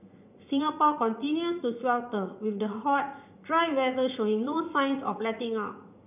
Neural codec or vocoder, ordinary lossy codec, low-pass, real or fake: vocoder, 44.1 kHz, 80 mel bands, Vocos; none; 3.6 kHz; fake